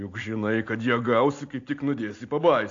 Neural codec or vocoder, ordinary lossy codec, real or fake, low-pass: none; AAC, 48 kbps; real; 7.2 kHz